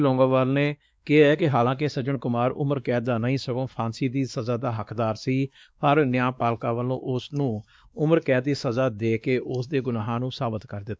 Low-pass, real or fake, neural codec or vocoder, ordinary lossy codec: none; fake; codec, 16 kHz, 2 kbps, X-Codec, WavLM features, trained on Multilingual LibriSpeech; none